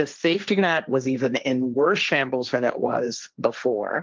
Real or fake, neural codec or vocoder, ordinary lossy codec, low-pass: fake; codec, 16 kHz, 1.1 kbps, Voila-Tokenizer; Opus, 24 kbps; 7.2 kHz